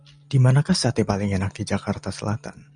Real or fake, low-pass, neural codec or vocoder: real; 9.9 kHz; none